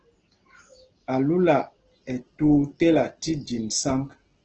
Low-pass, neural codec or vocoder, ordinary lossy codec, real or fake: 7.2 kHz; none; Opus, 16 kbps; real